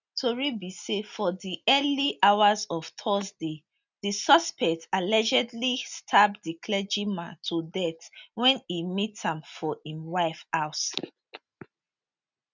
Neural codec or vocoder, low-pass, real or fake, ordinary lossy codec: none; 7.2 kHz; real; none